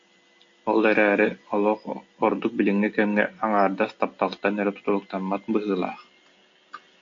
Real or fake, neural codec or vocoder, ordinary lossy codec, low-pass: real; none; AAC, 48 kbps; 7.2 kHz